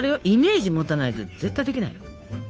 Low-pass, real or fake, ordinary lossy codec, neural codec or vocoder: none; fake; none; codec, 16 kHz, 2 kbps, FunCodec, trained on Chinese and English, 25 frames a second